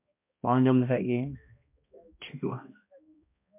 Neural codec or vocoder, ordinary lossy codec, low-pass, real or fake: codec, 16 kHz, 1 kbps, X-Codec, HuBERT features, trained on balanced general audio; MP3, 32 kbps; 3.6 kHz; fake